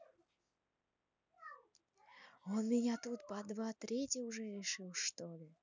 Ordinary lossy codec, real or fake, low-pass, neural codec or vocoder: none; real; 7.2 kHz; none